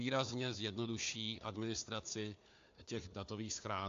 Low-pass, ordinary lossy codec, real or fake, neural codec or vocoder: 7.2 kHz; AAC, 48 kbps; fake; codec, 16 kHz, 4 kbps, FunCodec, trained on Chinese and English, 50 frames a second